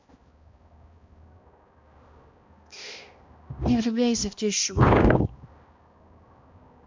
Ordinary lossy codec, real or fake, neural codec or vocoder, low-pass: MP3, 64 kbps; fake; codec, 16 kHz, 1 kbps, X-Codec, HuBERT features, trained on balanced general audio; 7.2 kHz